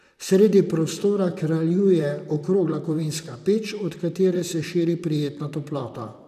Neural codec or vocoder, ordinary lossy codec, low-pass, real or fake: vocoder, 44.1 kHz, 128 mel bands, Pupu-Vocoder; none; 14.4 kHz; fake